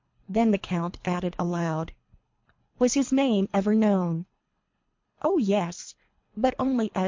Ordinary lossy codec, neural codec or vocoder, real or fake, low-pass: MP3, 48 kbps; codec, 24 kHz, 3 kbps, HILCodec; fake; 7.2 kHz